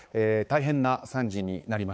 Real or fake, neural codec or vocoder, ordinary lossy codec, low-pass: fake; codec, 16 kHz, 4 kbps, X-Codec, HuBERT features, trained on balanced general audio; none; none